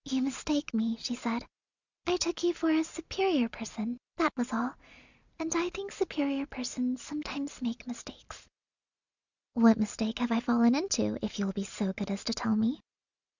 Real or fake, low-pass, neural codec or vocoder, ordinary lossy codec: real; 7.2 kHz; none; Opus, 64 kbps